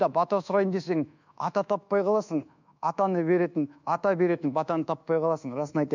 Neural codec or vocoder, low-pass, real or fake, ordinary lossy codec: codec, 24 kHz, 1.2 kbps, DualCodec; 7.2 kHz; fake; none